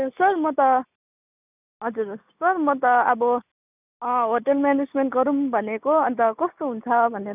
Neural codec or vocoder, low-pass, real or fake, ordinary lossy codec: none; 3.6 kHz; real; none